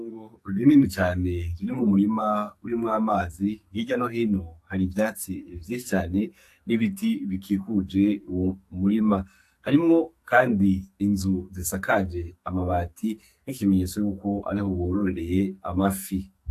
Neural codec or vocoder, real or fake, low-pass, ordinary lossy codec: codec, 44.1 kHz, 2.6 kbps, SNAC; fake; 14.4 kHz; AAC, 64 kbps